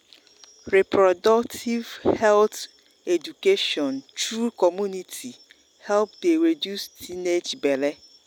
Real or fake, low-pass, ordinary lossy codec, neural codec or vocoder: real; 19.8 kHz; none; none